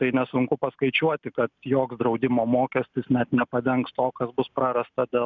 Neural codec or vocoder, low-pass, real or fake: none; 7.2 kHz; real